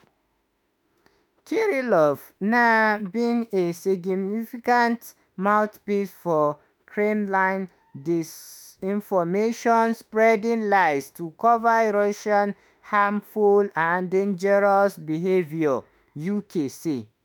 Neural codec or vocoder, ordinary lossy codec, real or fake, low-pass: autoencoder, 48 kHz, 32 numbers a frame, DAC-VAE, trained on Japanese speech; none; fake; none